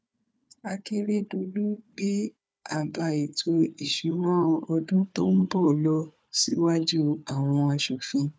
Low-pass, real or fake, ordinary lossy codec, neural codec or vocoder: none; fake; none; codec, 16 kHz, 4 kbps, FunCodec, trained on Chinese and English, 50 frames a second